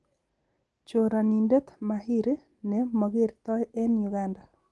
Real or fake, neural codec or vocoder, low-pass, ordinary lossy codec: real; none; 10.8 kHz; Opus, 24 kbps